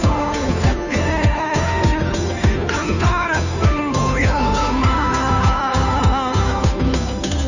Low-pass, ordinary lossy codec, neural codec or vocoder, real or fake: 7.2 kHz; none; codec, 16 kHz, 2 kbps, FunCodec, trained on Chinese and English, 25 frames a second; fake